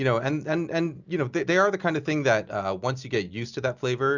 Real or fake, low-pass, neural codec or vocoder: real; 7.2 kHz; none